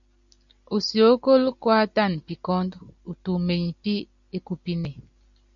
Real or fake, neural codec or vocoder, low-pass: real; none; 7.2 kHz